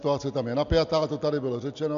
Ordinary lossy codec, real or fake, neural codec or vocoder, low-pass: MP3, 64 kbps; real; none; 7.2 kHz